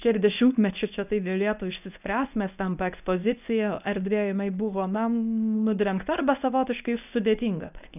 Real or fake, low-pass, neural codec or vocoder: fake; 3.6 kHz; codec, 24 kHz, 0.9 kbps, WavTokenizer, medium speech release version 1